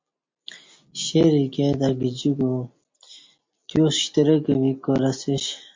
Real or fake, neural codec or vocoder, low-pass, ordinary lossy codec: real; none; 7.2 kHz; MP3, 48 kbps